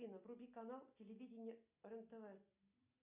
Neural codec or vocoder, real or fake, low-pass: none; real; 3.6 kHz